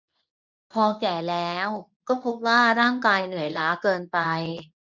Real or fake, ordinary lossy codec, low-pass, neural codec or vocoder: fake; none; 7.2 kHz; codec, 24 kHz, 0.9 kbps, WavTokenizer, medium speech release version 2